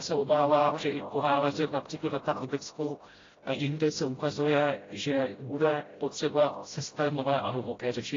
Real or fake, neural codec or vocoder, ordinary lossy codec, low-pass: fake; codec, 16 kHz, 0.5 kbps, FreqCodec, smaller model; AAC, 32 kbps; 7.2 kHz